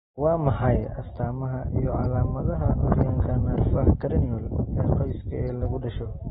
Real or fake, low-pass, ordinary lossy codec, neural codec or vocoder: real; 19.8 kHz; AAC, 16 kbps; none